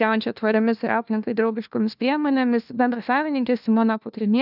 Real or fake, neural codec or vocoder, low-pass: fake; codec, 16 kHz, 1 kbps, FunCodec, trained on LibriTTS, 50 frames a second; 5.4 kHz